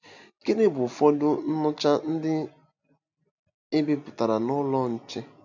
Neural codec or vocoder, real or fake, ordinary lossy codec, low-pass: none; real; none; 7.2 kHz